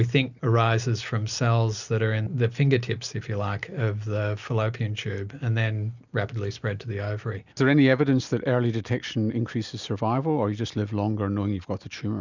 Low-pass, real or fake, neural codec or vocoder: 7.2 kHz; real; none